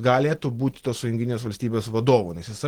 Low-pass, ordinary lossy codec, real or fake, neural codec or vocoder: 19.8 kHz; Opus, 16 kbps; real; none